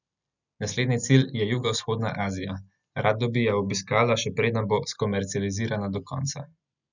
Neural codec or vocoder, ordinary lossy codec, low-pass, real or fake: none; none; 7.2 kHz; real